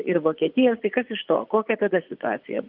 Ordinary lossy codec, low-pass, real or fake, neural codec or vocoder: Opus, 24 kbps; 5.4 kHz; fake; vocoder, 24 kHz, 100 mel bands, Vocos